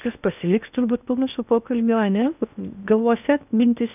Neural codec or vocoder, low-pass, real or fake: codec, 16 kHz in and 24 kHz out, 0.6 kbps, FocalCodec, streaming, 2048 codes; 3.6 kHz; fake